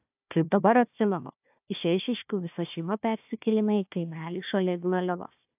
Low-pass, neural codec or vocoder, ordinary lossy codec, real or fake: 3.6 kHz; codec, 16 kHz, 1 kbps, FunCodec, trained on Chinese and English, 50 frames a second; AAC, 32 kbps; fake